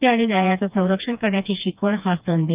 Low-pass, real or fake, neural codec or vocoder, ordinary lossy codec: 3.6 kHz; fake; codec, 16 kHz, 2 kbps, FreqCodec, smaller model; Opus, 24 kbps